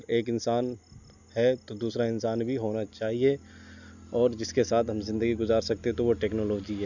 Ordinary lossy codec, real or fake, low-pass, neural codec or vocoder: none; real; 7.2 kHz; none